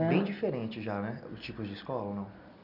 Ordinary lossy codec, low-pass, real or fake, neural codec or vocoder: none; 5.4 kHz; real; none